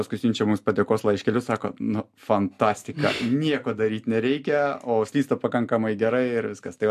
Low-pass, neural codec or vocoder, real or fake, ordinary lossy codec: 14.4 kHz; none; real; MP3, 64 kbps